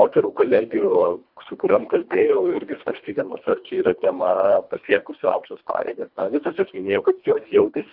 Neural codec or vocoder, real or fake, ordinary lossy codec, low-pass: codec, 24 kHz, 1.5 kbps, HILCodec; fake; Opus, 64 kbps; 5.4 kHz